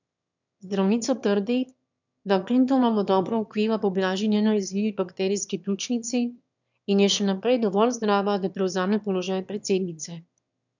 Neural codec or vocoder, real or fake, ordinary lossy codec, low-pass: autoencoder, 22.05 kHz, a latent of 192 numbers a frame, VITS, trained on one speaker; fake; none; 7.2 kHz